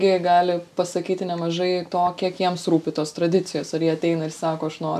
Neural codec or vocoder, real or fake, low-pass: none; real; 14.4 kHz